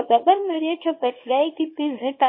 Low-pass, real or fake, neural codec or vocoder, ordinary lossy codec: 5.4 kHz; fake; codec, 24 kHz, 0.9 kbps, WavTokenizer, small release; MP3, 24 kbps